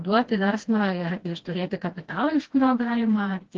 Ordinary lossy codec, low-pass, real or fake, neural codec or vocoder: Opus, 16 kbps; 7.2 kHz; fake; codec, 16 kHz, 1 kbps, FreqCodec, smaller model